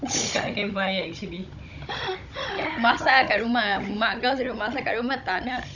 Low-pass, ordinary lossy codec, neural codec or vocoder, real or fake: 7.2 kHz; none; codec, 16 kHz, 16 kbps, FunCodec, trained on Chinese and English, 50 frames a second; fake